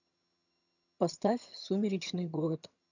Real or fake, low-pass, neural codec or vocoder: fake; 7.2 kHz; vocoder, 22.05 kHz, 80 mel bands, HiFi-GAN